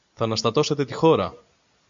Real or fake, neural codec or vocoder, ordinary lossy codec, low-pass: real; none; MP3, 64 kbps; 7.2 kHz